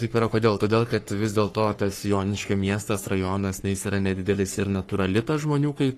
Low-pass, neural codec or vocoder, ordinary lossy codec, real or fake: 14.4 kHz; codec, 44.1 kHz, 3.4 kbps, Pupu-Codec; AAC, 48 kbps; fake